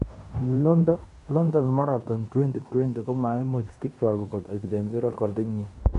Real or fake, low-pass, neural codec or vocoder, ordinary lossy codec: fake; 10.8 kHz; codec, 16 kHz in and 24 kHz out, 0.9 kbps, LongCat-Audio-Codec, fine tuned four codebook decoder; MP3, 64 kbps